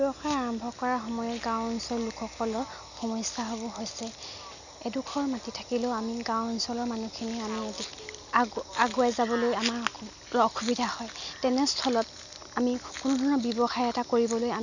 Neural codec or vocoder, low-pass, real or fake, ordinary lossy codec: none; 7.2 kHz; real; none